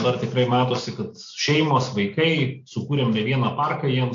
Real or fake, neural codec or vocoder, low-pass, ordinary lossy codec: real; none; 7.2 kHz; AAC, 48 kbps